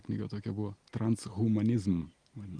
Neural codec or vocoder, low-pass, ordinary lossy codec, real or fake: none; 9.9 kHz; Opus, 24 kbps; real